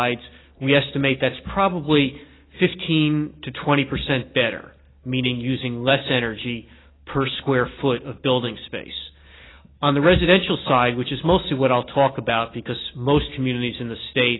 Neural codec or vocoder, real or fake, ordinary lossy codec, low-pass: none; real; AAC, 16 kbps; 7.2 kHz